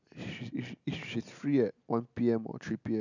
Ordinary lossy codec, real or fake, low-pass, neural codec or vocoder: none; real; 7.2 kHz; none